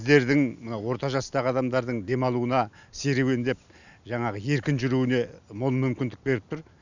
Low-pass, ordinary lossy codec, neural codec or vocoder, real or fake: 7.2 kHz; none; none; real